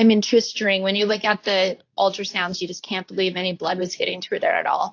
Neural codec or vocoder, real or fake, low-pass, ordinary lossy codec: codec, 24 kHz, 0.9 kbps, WavTokenizer, medium speech release version 2; fake; 7.2 kHz; AAC, 48 kbps